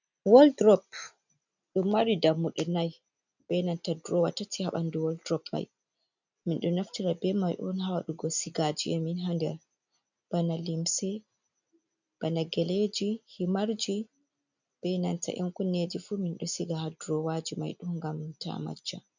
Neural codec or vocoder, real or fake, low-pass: none; real; 7.2 kHz